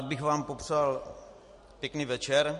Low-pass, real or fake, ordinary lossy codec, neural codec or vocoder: 14.4 kHz; real; MP3, 48 kbps; none